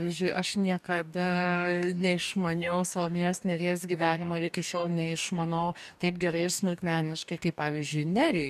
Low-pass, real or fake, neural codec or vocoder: 14.4 kHz; fake; codec, 44.1 kHz, 2.6 kbps, DAC